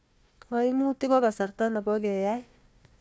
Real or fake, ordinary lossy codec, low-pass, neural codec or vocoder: fake; none; none; codec, 16 kHz, 1 kbps, FunCodec, trained on Chinese and English, 50 frames a second